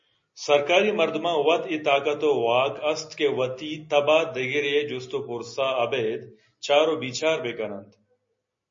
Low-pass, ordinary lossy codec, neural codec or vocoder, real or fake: 7.2 kHz; MP3, 32 kbps; none; real